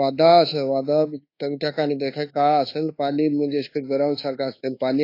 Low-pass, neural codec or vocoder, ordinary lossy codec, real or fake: 5.4 kHz; codec, 24 kHz, 1.2 kbps, DualCodec; AAC, 32 kbps; fake